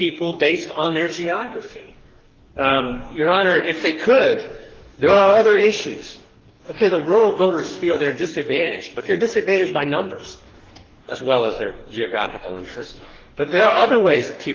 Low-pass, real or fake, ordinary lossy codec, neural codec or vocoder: 7.2 kHz; fake; Opus, 16 kbps; codec, 44.1 kHz, 2.6 kbps, DAC